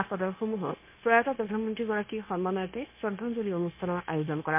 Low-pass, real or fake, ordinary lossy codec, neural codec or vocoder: 3.6 kHz; fake; MP3, 24 kbps; codec, 16 kHz, 0.9 kbps, LongCat-Audio-Codec